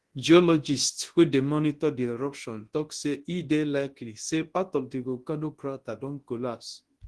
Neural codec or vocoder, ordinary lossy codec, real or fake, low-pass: codec, 24 kHz, 0.9 kbps, WavTokenizer, large speech release; Opus, 16 kbps; fake; 10.8 kHz